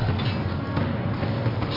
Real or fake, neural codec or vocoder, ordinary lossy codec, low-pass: real; none; MP3, 32 kbps; 5.4 kHz